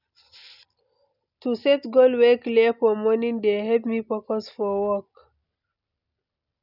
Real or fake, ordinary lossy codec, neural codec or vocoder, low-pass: real; none; none; 5.4 kHz